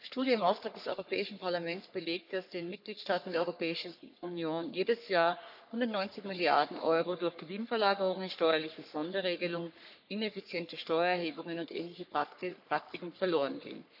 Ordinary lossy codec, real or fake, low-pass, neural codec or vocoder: none; fake; 5.4 kHz; codec, 44.1 kHz, 3.4 kbps, Pupu-Codec